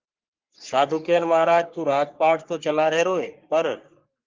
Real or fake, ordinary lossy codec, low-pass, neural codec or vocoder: fake; Opus, 32 kbps; 7.2 kHz; codec, 44.1 kHz, 3.4 kbps, Pupu-Codec